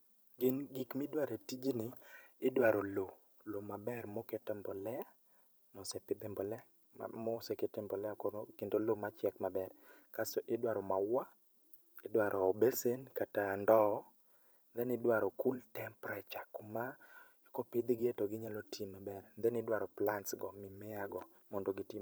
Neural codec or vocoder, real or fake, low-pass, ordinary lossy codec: vocoder, 44.1 kHz, 128 mel bands every 512 samples, BigVGAN v2; fake; none; none